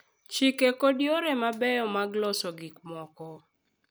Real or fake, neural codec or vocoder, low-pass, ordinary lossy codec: real; none; none; none